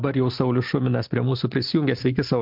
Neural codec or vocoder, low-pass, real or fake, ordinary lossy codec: none; 5.4 kHz; real; MP3, 48 kbps